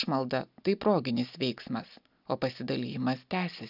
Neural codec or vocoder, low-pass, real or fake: none; 5.4 kHz; real